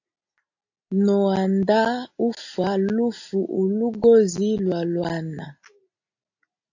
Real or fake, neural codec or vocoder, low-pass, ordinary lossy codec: real; none; 7.2 kHz; AAC, 48 kbps